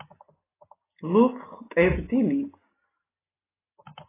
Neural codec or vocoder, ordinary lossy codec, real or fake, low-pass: none; AAC, 16 kbps; real; 3.6 kHz